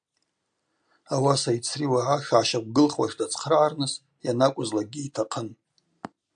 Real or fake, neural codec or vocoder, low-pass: real; none; 9.9 kHz